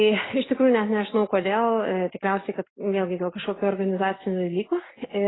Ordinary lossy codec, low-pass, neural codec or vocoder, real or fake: AAC, 16 kbps; 7.2 kHz; none; real